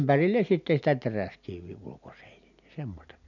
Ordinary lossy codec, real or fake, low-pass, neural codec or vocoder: none; real; 7.2 kHz; none